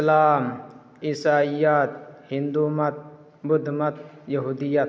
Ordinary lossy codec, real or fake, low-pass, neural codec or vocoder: none; real; none; none